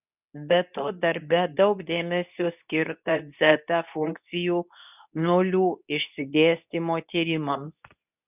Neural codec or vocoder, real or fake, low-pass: codec, 24 kHz, 0.9 kbps, WavTokenizer, medium speech release version 2; fake; 3.6 kHz